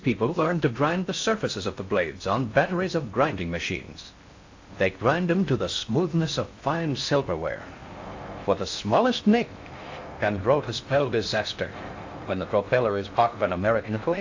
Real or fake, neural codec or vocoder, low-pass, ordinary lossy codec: fake; codec, 16 kHz in and 24 kHz out, 0.6 kbps, FocalCodec, streaming, 4096 codes; 7.2 kHz; AAC, 48 kbps